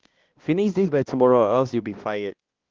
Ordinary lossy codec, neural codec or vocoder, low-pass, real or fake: Opus, 16 kbps; codec, 16 kHz, 1 kbps, X-Codec, HuBERT features, trained on balanced general audio; 7.2 kHz; fake